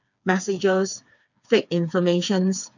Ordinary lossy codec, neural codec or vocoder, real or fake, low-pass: none; codec, 16 kHz, 4 kbps, FreqCodec, smaller model; fake; 7.2 kHz